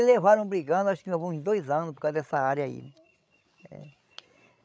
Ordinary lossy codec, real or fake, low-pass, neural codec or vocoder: none; fake; none; codec, 16 kHz, 16 kbps, FreqCodec, larger model